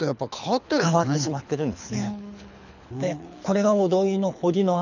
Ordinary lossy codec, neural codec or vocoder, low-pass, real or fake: none; codec, 24 kHz, 6 kbps, HILCodec; 7.2 kHz; fake